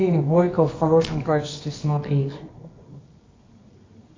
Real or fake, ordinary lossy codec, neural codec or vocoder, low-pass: fake; AAC, 32 kbps; codec, 24 kHz, 0.9 kbps, WavTokenizer, medium music audio release; 7.2 kHz